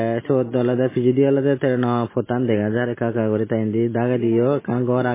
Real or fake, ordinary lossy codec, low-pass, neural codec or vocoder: real; MP3, 16 kbps; 3.6 kHz; none